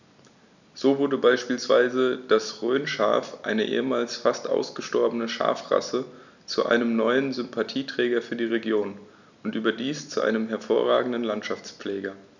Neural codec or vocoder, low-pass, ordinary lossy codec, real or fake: none; 7.2 kHz; none; real